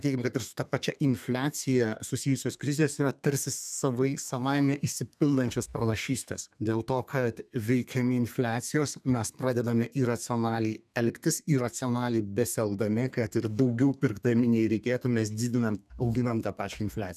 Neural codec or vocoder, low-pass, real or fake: codec, 32 kHz, 1.9 kbps, SNAC; 14.4 kHz; fake